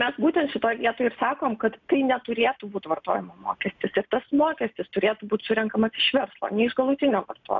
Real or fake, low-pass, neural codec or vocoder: real; 7.2 kHz; none